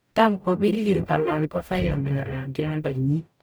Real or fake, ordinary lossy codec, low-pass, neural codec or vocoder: fake; none; none; codec, 44.1 kHz, 0.9 kbps, DAC